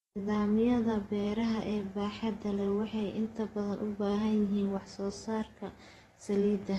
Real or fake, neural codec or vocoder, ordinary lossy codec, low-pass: fake; vocoder, 44.1 kHz, 128 mel bands every 512 samples, BigVGAN v2; AAC, 32 kbps; 19.8 kHz